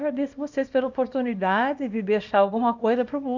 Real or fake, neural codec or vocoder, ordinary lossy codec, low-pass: fake; codec, 24 kHz, 0.9 kbps, WavTokenizer, small release; none; 7.2 kHz